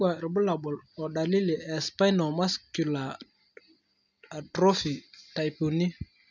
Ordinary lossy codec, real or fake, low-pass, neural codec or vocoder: none; real; 7.2 kHz; none